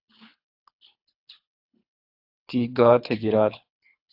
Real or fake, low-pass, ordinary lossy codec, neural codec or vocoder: fake; 5.4 kHz; AAC, 48 kbps; codec, 24 kHz, 3 kbps, HILCodec